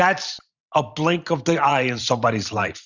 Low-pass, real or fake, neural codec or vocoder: 7.2 kHz; fake; vocoder, 44.1 kHz, 128 mel bands every 512 samples, BigVGAN v2